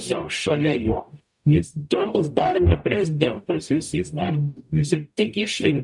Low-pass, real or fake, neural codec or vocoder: 10.8 kHz; fake; codec, 44.1 kHz, 0.9 kbps, DAC